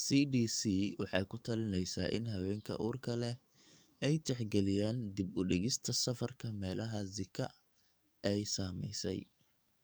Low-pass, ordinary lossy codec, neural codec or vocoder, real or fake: none; none; codec, 44.1 kHz, 7.8 kbps, DAC; fake